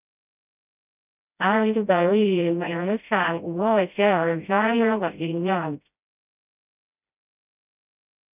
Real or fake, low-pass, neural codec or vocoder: fake; 3.6 kHz; codec, 16 kHz, 0.5 kbps, FreqCodec, smaller model